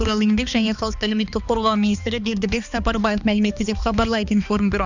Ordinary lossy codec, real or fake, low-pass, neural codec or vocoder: none; fake; 7.2 kHz; codec, 16 kHz, 2 kbps, X-Codec, HuBERT features, trained on balanced general audio